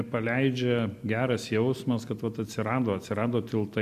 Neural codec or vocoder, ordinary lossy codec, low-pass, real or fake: none; MP3, 64 kbps; 14.4 kHz; real